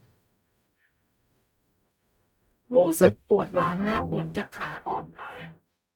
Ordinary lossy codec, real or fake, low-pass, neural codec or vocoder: none; fake; 19.8 kHz; codec, 44.1 kHz, 0.9 kbps, DAC